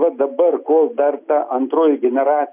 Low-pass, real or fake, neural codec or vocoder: 3.6 kHz; real; none